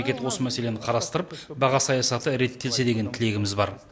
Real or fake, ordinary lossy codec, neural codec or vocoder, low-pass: real; none; none; none